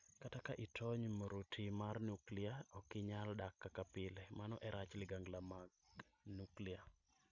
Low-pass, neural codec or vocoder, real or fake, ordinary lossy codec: 7.2 kHz; none; real; none